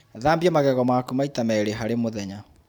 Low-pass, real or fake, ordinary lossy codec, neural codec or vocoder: none; fake; none; vocoder, 44.1 kHz, 128 mel bands every 512 samples, BigVGAN v2